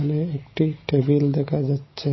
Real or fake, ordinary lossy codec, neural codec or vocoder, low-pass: real; MP3, 24 kbps; none; 7.2 kHz